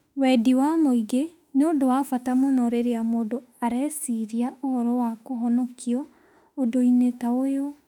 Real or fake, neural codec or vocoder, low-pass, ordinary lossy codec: fake; autoencoder, 48 kHz, 32 numbers a frame, DAC-VAE, trained on Japanese speech; 19.8 kHz; none